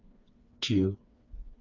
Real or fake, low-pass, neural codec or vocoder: fake; 7.2 kHz; codec, 16 kHz, 4 kbps, FreqCodec, smaller model